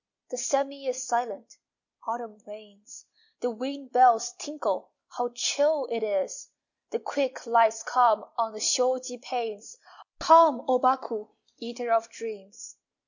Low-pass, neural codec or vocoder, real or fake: 7.2 kHz; none; real